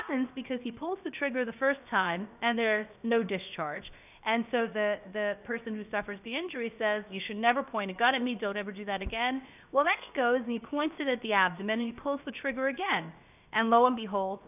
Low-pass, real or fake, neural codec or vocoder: 3.6 kHz; fake; codec, 16 kHz, about 1 kbps, DyCAST, with the encoder's durations